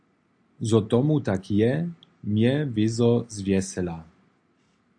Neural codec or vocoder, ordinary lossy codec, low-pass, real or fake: none; AAC, 64 kbps; 9.9 kHz; real